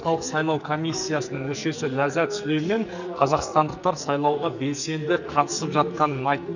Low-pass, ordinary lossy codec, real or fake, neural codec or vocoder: 7.2 kHz; none; fake; codec, 44.1 kHz, 2.6 kbps, SNAC